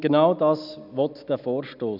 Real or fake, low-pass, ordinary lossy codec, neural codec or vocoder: real; 5.4 kHz; none; none